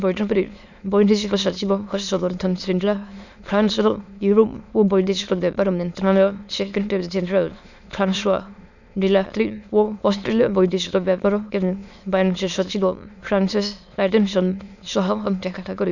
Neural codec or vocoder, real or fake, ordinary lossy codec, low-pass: autoencoder, 22.05 kHz, a latent of 192 numbers a frame, VITS, trained on many speakers; fake; none; 7.2 kHz